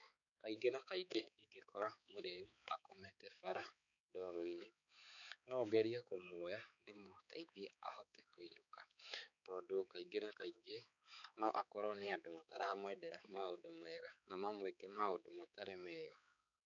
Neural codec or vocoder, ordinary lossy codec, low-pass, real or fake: codec, 16 kHz, 4 kbps, X-Codec, HuBERT features, trained on balanced general audio; none; 7.2 kHz; fake